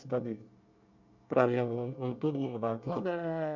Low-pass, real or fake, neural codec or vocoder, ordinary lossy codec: 7.2 kHz; fake; codec, 24 kHz, 1 kbps, SNAC; none